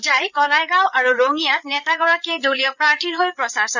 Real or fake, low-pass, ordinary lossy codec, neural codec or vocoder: fake; 7.2 kHz; none; codec, 16 kHz, 8 kbps, FreqCodec, larger model